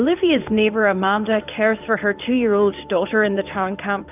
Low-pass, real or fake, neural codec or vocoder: 3.6 kHz; fake; codec, 16 kHz in and 24 kHz out, 1 kbps, XY-Tokenizer